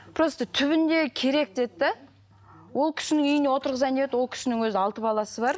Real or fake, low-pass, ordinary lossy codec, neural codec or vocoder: real; none; none; none